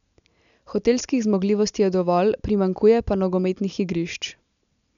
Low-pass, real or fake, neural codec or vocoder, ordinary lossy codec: 7.2 kHz; real; none; none